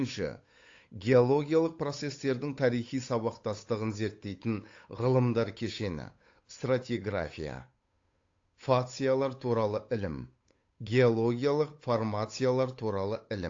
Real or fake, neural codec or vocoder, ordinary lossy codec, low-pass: fake; codec, 16 kHz, 8 kbps, FunCodec, trained on Chinese and English, 25 frames a second; AAC, 48 kbps; 7.2 kHz